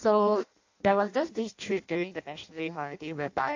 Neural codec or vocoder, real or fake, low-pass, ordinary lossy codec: codec, 16 kHz in and 24 kHz out, 0.6 kbps, FireRedTTS-2 codec; fake; 7.2 kHz; AAC, 48 kbps